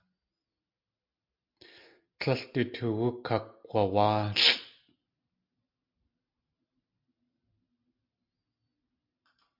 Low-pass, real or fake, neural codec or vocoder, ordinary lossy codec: 5.4 kHz; real; none; MP3, 48 kbps